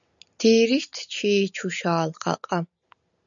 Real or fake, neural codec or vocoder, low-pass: real; none; 7.2 kHz